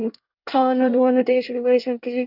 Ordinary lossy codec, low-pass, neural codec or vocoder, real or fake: none; 5.4 kHz; codec, 24 kHz, 1 kbps, SNAC; fake